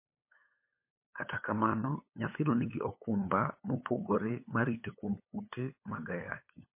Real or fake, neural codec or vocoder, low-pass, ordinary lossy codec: fake; codec, 16 kHz, 8 kbps, FunCodec, trained on LibriTTS, 25 frames a second; 3.6 kHz; MP3, 24 kbps